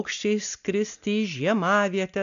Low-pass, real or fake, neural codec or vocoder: 7.2 kHz; real; none